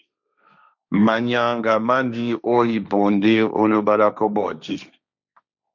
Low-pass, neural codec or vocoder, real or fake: 7.2 kHz; codec, 16 kHz, 1.1 kbps, Voila-Tokenizer; fake